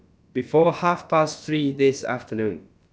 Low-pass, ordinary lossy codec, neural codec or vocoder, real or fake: none; none; codec, 16 kHz, about 1 kbps, DyCAST, with the encoder's durations; fake